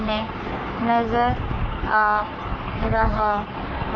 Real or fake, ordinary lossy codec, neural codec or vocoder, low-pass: fake; none; codec, 44.1 kHz, 3.4 kbps, Pupu-Codec; 7.2 kHz